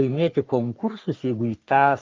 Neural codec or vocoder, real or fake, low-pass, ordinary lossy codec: codec, 44.1 kHz, 3.4 kbps, Pupu-Codec; fake; 7.2 kHz; Opus, 24 kbps